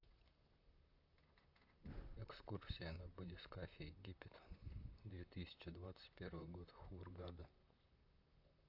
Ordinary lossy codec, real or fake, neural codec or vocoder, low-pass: AAC, 48 kbps; fake; vocoder, 44.1 kHz, 128 mel bands, Pupu-Vocoder; 5.4 kHz